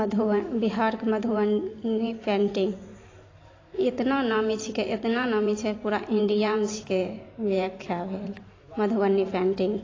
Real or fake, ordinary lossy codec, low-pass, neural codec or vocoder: fake; AAC, 32 kbps; 7.2 kHz; vocoder, 44.1 kHz, 128 mel bands every 512 samples, BigVGAN v2